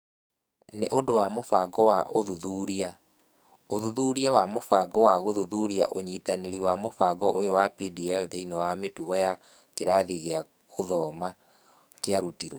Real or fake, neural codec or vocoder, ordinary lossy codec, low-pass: fake; codec, 44.1 kHz, 2.6 kbps, SNAC; none; none